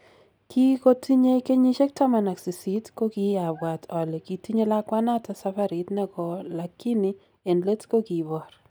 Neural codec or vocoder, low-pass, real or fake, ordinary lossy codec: none; none; real; none